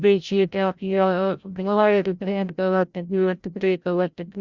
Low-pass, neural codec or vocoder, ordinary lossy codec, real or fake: 7.2 kHz; codec, 16 kHz, 0.5 kbps, FreqCodec, larger model; Opus, 64 kbps; fake